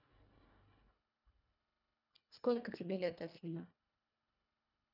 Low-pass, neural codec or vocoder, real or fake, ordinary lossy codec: 5.4 kHz; codec, 24 kHz, 1.5 kbps, HILCodec; fake; none